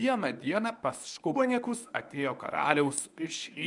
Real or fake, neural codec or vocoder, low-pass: fake; codec, 24 kHz, 0.9 kbps, WavTokenizer, medium speech release version 1; 10.8 kHz